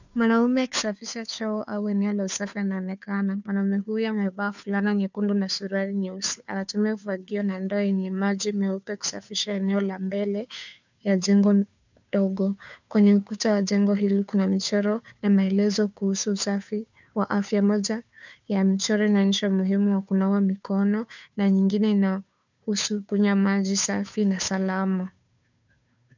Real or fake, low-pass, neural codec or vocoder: fake; 7.2 kHz; codec, 16 kHz, 2 kbps, FunCodec, trained on Chinese and English, 25 frames a second